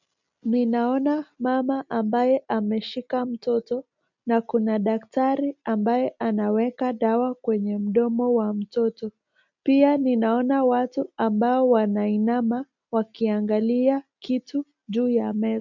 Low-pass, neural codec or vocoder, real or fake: 7.2 kHz; none; real